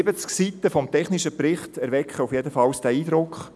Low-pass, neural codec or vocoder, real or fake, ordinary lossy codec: none; none; real; none